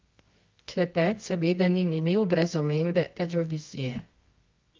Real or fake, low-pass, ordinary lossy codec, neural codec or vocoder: fake; 7.2 kHz; Opus, 24 kbps; codec, 24 kHz, 0.9 kbps, WavTokenizer, medium music audio release